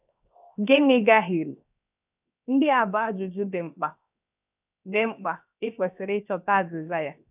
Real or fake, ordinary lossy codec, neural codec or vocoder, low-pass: fake; none; codec, 16 kHz, 0.7 kbps, FocalCodec; 3.6 kHz